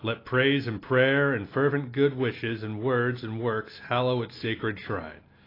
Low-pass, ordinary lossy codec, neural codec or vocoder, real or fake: 5.4 kHz; AAC, 24 kbps; none; real